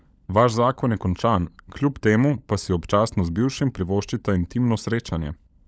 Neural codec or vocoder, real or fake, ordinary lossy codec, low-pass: codec, 16 kHz, 16 kbps, FunCodec, trained on LibriTTS, 50 frames a second; fake; none; none